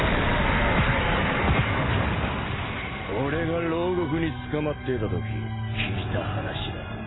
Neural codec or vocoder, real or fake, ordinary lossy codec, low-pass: none; real; AAC, 16 kbps; 7.2 kHz